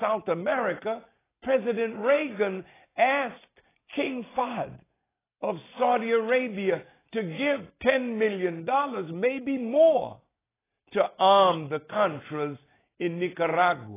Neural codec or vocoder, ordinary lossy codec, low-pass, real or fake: none; AAC, 16 kbps; 3.6 kHz; real